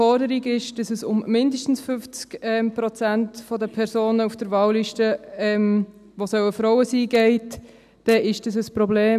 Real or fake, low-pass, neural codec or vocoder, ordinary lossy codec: real; 14.4 kHz; none; none